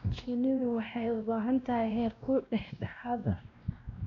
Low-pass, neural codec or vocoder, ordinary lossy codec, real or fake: 7.2 kHz; codec, 16 kHz, 1 kbps, X-Codec, WavLM features, trained on Multilingual LibriSpeech; none; fake